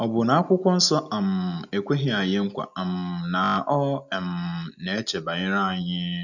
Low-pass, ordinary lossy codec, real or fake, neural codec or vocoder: 7.2 kHz; none; real; none